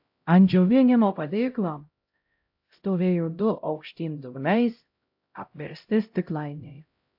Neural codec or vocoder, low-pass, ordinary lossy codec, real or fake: codec, 16 kHz, 0.5 kbps, X-Codec, HuBERT features, trained on LibriSpeech; 5.4 kHz; AAC, 48 kbps; fake